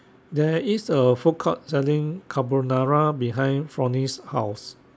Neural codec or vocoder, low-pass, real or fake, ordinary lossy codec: none; none; real; none